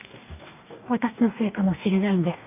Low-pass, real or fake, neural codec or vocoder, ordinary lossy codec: 3.6 kHz; fake; codec, 44.1 kHz, 2.6 kbps, DAC; none